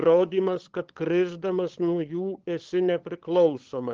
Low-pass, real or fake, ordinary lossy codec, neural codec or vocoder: 7.2 kHz; fake; Opus, 16 kbps; codec, 16 kHz, 8 kbps, FunCodec, trained on LibriTTS, 25 frames a second